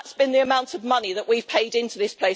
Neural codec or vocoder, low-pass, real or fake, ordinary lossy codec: none; none; real; none